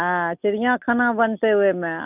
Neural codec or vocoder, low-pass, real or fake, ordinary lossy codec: none; 3.6 kHz; real; none